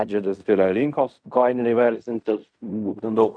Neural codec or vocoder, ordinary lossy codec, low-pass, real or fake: codec, 16 kHz in and 24 kHz out, 0.4 kbps, LongCat-Audio-Codec, fine tuned four codebook decoder; MP3, 96 kbps; 9.9 kHz; fake